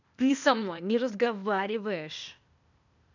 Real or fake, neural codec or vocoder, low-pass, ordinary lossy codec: fake; codec, 16 kHz, 0.8 kbps, ZipCodec; 7.2 kHz; none